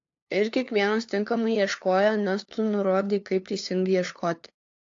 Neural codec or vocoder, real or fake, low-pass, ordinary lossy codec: codec, 16 kHz, 2 kbps, FunCodec, trained on LibriTTS, 25 frames a second; fake; 7.2 kHz; AAC, 48 kbps